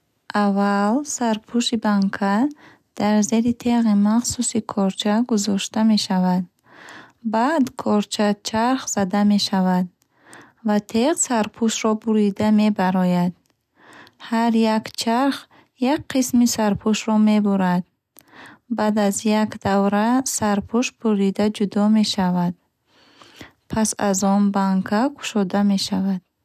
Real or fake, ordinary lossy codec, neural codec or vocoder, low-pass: real; none; none; 14.4 kHz